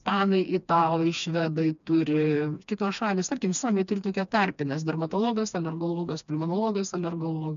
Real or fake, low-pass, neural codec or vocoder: fake; 7.2 kHz; codec, 16 kHz, 2 kbps, FreqCodec, smaller model